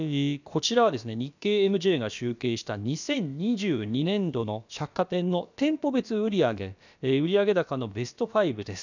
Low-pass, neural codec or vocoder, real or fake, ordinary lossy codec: 7.2 kHz; codec, 16 kHz, about 1 kbps, DyCAST, with the encoder's durations; fake; none